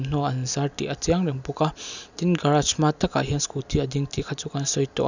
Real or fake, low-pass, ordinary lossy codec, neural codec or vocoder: real; 7.2 kHz; none; none